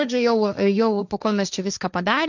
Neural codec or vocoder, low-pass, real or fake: codec, 16 kHz, 1.1 kbps, Voila-Tokenizer; 7.2 kHz; fake